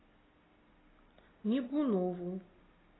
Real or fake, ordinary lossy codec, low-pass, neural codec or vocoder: real; AAC, 16 kbps; 7.2 kHz; none